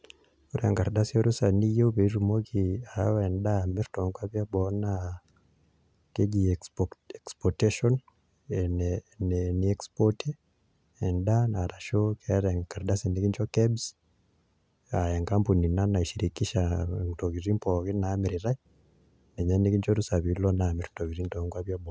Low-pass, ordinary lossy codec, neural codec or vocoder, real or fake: none; none; none; real